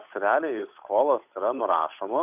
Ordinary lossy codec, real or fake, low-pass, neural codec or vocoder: AAC, 32 kbps; fake; 3.6 kHz; codec, 16 kHz, 8 kbps, FunCodec, trained on Chinese and English, 25 frames a second